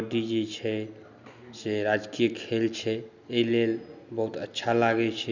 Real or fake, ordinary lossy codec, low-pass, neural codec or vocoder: real; none; 7.2 kHz; none